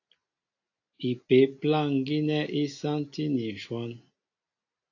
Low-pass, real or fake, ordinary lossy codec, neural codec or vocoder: 7.2 kHz; real; AAC, 48 kbps; none